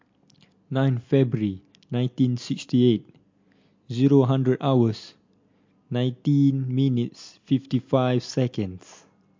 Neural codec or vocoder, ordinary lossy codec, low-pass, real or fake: none; MP3, 48 kbps; 7.2 kHz; real